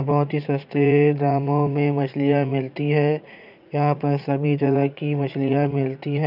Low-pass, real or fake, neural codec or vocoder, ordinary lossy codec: 5.4 kHz; fake; vocoder, 44.1 kHz, 80 mel bands, Vocos; none